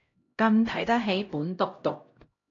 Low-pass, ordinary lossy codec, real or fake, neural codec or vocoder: 7.2 kHz; AAC, 32 kbps; fake; codec, 16 kHz, 0.5 kbps, X-Codec, HuBERT features, trained on LibriSpeech